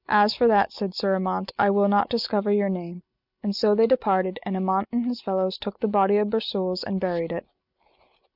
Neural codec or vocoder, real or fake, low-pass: none; real; 5.4 kHz